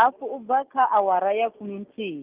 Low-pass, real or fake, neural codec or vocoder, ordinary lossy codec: 3.6 kHz; real; none; Opus, 32 kbps